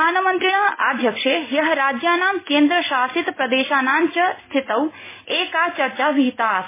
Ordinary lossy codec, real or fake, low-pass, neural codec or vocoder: MP3, 16 kbps; real; 3.6 kHz; none